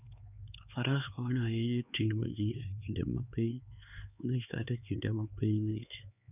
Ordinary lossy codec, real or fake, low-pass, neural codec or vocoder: none; fake; 3.6 kHz; codec, 16 kHz, 4 kbps, X-Codec, HuBERT features, trained on LibriSpeech